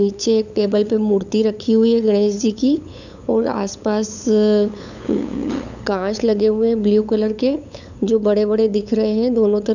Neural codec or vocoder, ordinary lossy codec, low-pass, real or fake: codec, 16 kHz, 8 kbps, FunCodec, trained on LibriTTS, 25 frames a second; none; 7.2 kHz; fake